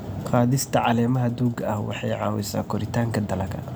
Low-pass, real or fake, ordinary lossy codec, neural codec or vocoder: none; real; none; none